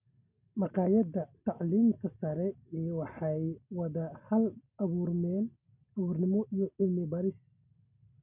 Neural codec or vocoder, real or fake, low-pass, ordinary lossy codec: none; real; 3.6 kHz; none